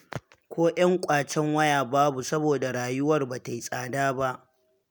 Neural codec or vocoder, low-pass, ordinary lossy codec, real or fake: none; none; none; real